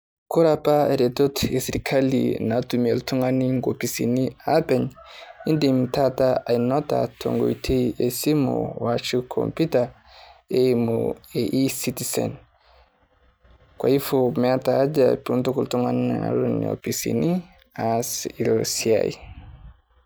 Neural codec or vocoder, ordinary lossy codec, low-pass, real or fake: none; none; none; real